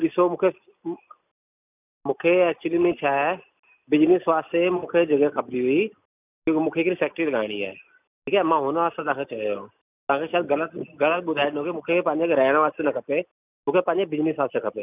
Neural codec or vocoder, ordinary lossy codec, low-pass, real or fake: none; none; 3.6 kHz; real